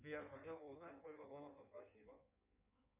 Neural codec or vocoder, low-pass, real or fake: codec, 16 kHz in and 24 kHz out, 1.1 kbps, FireRedTTS-2 codec; 3.6 kHz; fake